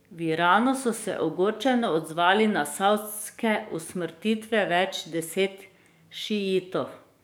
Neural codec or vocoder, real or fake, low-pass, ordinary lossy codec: codec, 44.1 kHz, 7.8 kbps, DAC; fake; none; none